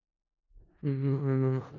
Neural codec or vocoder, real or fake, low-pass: codec, 16 kHz in and 24 kHz out, 0.4 kbps, LongCat-Audio-Codec, four codebook decoder; fake; 7.2 kHz